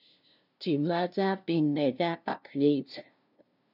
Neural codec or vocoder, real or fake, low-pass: codec, 16 kHz, 0.5 kbps, FunCodec, trained on LibriTTS, 25 frames a second; fake; 5.4 kHz